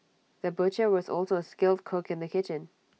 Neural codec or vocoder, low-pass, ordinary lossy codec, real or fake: none; none; none; real